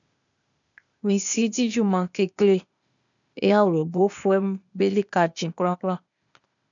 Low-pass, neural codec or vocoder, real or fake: 7.2 kHz; codec, 16 kHz, 0.8 kbps, ZipCodec; fake